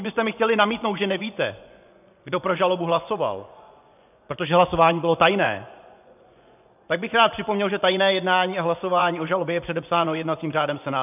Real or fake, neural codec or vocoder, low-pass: fake; vocoder, 24 kHz, 100 mel bands, Vocos; 3.6 kHz